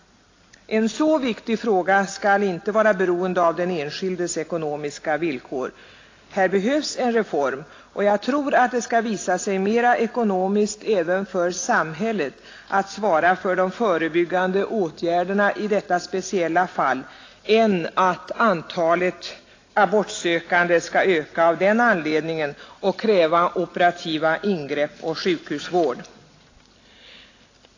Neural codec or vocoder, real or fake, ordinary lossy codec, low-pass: none; real; AAC, 32 kbps; 7.2 kHz